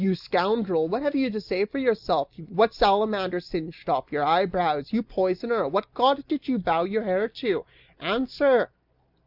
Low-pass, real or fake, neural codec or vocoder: 5.4 kHz; real; none